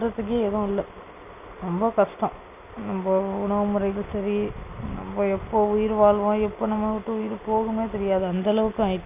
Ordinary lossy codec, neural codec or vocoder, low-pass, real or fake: none; none; 3.6 kHz; real